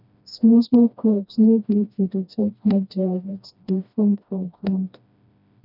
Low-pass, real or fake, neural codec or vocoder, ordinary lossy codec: 5.4 kHz; fake; codec, 16 kHz, 1 kbps, FreqCodec, smaller model; none